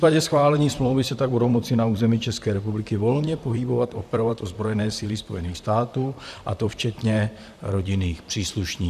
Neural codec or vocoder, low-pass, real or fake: vocoder, 44.1 kHz, 128 mel bands, Pupu-Vocoder; 14.4 kHz; fake